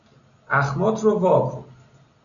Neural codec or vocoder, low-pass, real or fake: none; 7.2 kHz; real